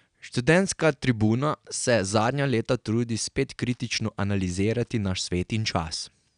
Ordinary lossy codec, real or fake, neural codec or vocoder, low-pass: none; real; none; 9.9 kHz